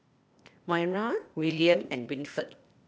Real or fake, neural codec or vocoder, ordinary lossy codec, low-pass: fake; codec, 16 kHz, 0.8 kbps, ZipCodec; none; none